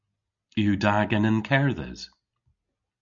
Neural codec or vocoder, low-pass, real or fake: none; 7.2 kHz; real